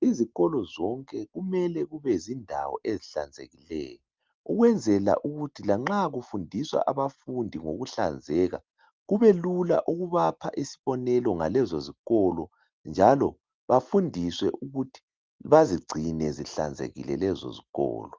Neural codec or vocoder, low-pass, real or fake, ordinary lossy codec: none; 7.2 kHz; real; Opus, 32 kbps